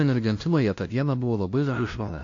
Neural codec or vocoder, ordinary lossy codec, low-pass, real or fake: codec, 16 kHz, 0.5 kbps, FunCodec, trained on LibriTTS, 25 frames a second; AAC, 48 kbps; 7.2 kHz; fake